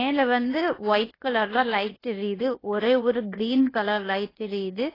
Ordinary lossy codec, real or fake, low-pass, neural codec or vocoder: AAC, 24 kbps; fake; 5.4 kHz; codec, 16 kHz, 0.8 kbps, ZipCodec